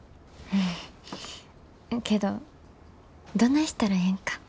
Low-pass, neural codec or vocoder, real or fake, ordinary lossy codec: none; none; real; none